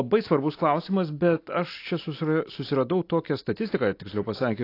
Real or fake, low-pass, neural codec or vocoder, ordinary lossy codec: real; 5.4 kHz; none; AAC, 32 kbps